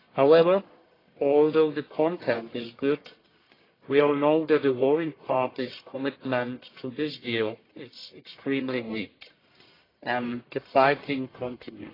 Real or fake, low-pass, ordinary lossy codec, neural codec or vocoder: fake; 5.4 kHz; AAC, 24 kbps; codec, 44.1 kHz, 1.7 kbps, Pupu-Codec